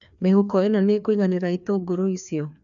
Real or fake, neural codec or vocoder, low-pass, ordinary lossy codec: fake; codec, 16 kHz, 2 kbps, FreqCodec, larger model; 7.2 kHz; none